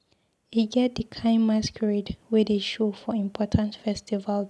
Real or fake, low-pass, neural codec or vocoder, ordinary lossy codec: real; none; none; none